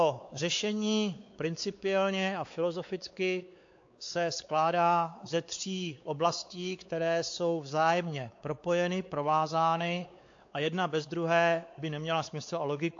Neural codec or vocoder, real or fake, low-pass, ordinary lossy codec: codec, 16 kHz, 4 kbps, X-Codec, WavLM features, trained on Multilingual LibriSpeech; fake; 7.2 kHz; AAC, 48 kbps